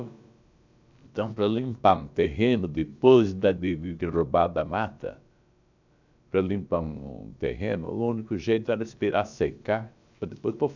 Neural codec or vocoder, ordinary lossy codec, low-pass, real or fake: codec, 16 kHz, about 1 kbps, DyCAST, with the encoder's durations; none; 7.2 kHz; fake